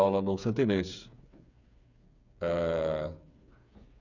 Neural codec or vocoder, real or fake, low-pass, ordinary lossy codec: codec, 16 kHz, 8 kbps, FreqCodec, smaller model; fake; 7.2 kHz; none